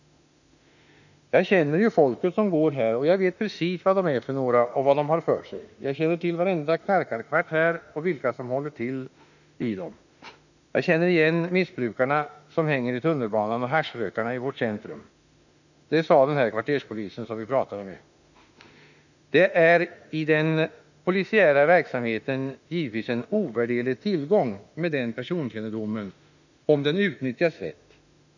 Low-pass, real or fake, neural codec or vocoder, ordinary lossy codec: 7.2 kHz; fake; autoencoder, 48 kHz, 32 numbers a frame, DAC-VAE, trained on Japanese speech; none